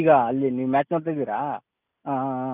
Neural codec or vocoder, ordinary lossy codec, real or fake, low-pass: none; none; real; 3.6 kHz